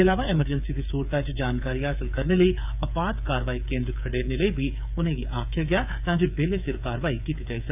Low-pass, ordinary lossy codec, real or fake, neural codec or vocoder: 3.6 kHz; none; fake; codec, 16 kHz, 8 kbps, FreqCodec, smaller model